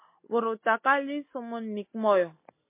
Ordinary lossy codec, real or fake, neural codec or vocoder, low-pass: MP3, 24 kbps; real; none; 3.6 kHz